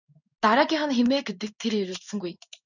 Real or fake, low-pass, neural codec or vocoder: fake; 7.2 kHz; codec, 16 kHz in and 24 kHz out, 1 kbps, XY-Tokenizer